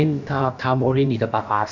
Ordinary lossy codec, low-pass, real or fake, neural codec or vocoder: none; 7.2 kHz; fake; codec, 16 kHz, about 1 kbps, DyCAST, with the encoder's durations